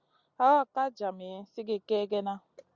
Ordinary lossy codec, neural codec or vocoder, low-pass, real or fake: Opus, 64 kbps; none; 7.2 kHz; real